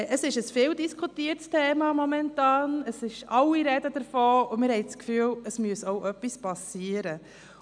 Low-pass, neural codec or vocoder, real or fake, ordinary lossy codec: 9.9 kHz; none; real; none